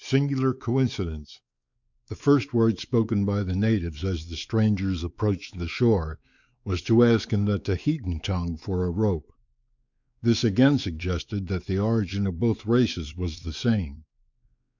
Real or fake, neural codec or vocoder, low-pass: fake; codec, 16 kHz, 4 kbps, X-Codec, WavLM features, trained on Multilingual LibriSpeech; 7.2 kHz